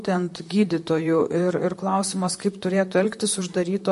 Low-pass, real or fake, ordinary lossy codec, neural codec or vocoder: 14.4 kHz; fake; MP3, 48 kbps; vocoder, 44.1 kHz, 128 mel bands, Pupu-Vocoder